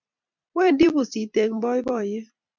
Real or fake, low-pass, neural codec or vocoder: fake; 7.2 kHz; vocoder, 44.1 kHz, 128 mel bands every 256 samples, BigVGAN v2